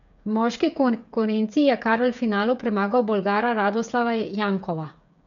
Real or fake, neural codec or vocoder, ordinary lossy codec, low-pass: fake; codec, 16 kHz, 8 kbps, FreqCodec, smaller model; none; 7.2 kHz